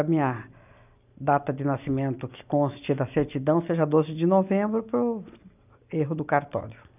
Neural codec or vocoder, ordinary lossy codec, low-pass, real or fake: none; none; 3.6 kHz; real